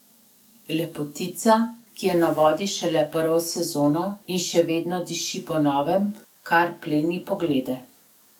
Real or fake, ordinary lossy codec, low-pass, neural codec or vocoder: fake; none; 19.8 kHz; codec, 44.1 kHz, 7.8 kbps, DAC